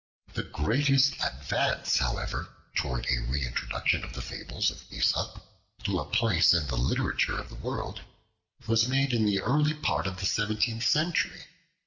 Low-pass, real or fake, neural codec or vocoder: 7.2 kHz; real; none